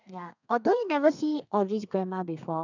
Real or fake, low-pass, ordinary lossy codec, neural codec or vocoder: fake; 7.2 kHz; none; codec, 32 kHz, 1.9 kbps, SNAC